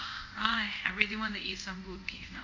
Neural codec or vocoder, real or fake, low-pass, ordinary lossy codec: codec, 24 kHz, 0.5 kbps, DualCodec; fake; 7.2 kHz; AAC, 48 kbps